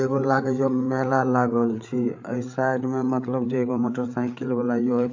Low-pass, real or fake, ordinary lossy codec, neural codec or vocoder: 7.2 kHz; fake; AAC, 48 kbps; codec, 16 kHz, 8 kbps, FreqCodec, larger model